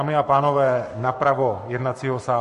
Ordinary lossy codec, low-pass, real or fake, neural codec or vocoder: MP3, 48 kbps; 14.4 kHz; fake; codec, 44.1 kHz, 7.8 kbps, Pupu-Codec